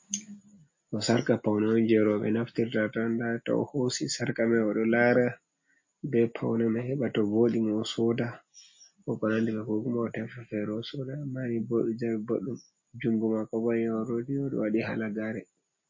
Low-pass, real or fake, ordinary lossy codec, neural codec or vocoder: 7.2 kHz; real; MP3, 32 kbps; none